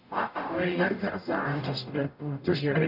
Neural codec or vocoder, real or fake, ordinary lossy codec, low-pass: codec, 44.1 kHz, 0.9 kbps, DAC; fake; none; 5.4 kHz